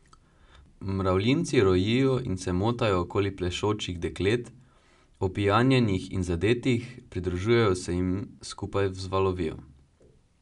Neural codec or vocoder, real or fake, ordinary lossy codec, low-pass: none; real; none; 10.8 kHz